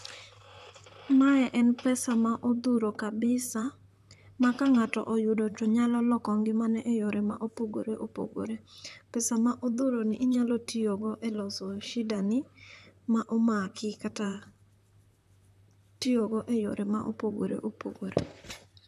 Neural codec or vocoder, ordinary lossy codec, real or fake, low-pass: vocoder, 44.1 kHz, 128 mel bands, Pupu-Vocoder; none; fake; 14.4 kHz